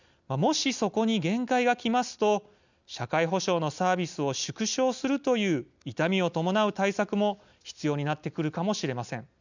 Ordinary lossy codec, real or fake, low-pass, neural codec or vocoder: none; real; 7.2 kHz; none